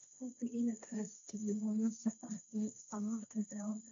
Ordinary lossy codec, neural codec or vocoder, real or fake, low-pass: none; codec, 16 kHz, 1.1 kbps, Voila-Tokenizer; fake; 7.2 kHz